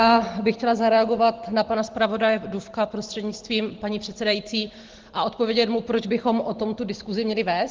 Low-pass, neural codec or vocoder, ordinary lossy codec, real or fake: 7.2 kHz; none; Opus, 16 kbps; real